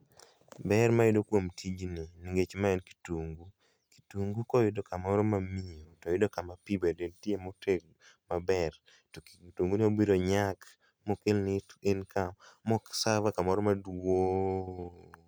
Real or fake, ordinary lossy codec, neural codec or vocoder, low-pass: real; none; none; none